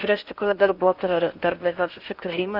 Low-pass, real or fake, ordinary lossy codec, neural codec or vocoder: 5.4 kHz; fake; Opus, 64 kbps; codec, 16 kHz in and 24 kHz out, 0.8 kbps, FocalCodec, streaming, 65536 codes